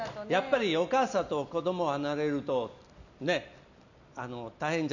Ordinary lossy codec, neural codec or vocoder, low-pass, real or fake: none; none; 7.2 kHz; real